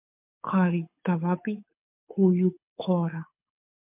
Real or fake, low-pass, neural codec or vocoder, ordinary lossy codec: fake; 3.6 kHz; codec, 24 kHz, 6 kbps, HILCodec; MP3, 32 kbps